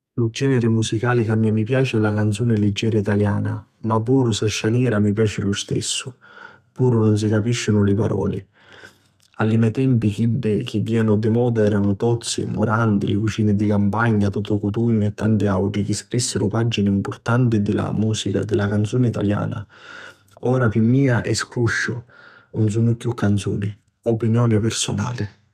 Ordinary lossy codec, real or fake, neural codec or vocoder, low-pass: none; fake; codec, 32 kHz, 1.9 kbps, SNAC; 14.4 kHz